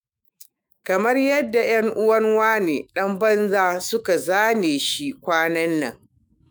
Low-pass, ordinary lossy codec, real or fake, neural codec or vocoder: none; none; fake; autoencoder, 48 kHz, 128 numbers a frame, DAC-VAE, trained on Japanese speech